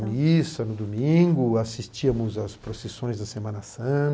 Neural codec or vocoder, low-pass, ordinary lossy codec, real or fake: none; none; none; real